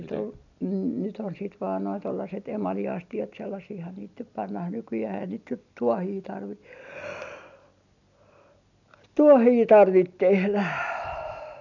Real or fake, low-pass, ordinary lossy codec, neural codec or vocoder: real; 7.2 kHz; none; none